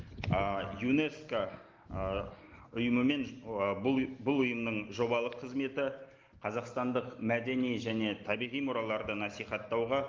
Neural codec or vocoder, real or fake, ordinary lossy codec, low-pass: none; real; Opus, 32 kbps; 7.2 kHz